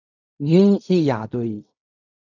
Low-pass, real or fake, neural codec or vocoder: 7.2 kHz; fake; codec, 16 kHz in and 24 kHz out, 0.4 kbps, LongCat-Audio-Codec, fine tuned four codebook decoder